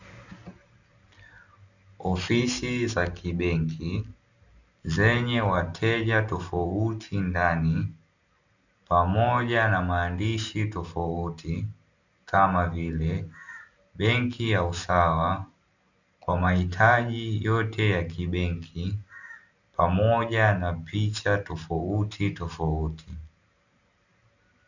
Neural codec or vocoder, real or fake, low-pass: none; real; 7.2 kHz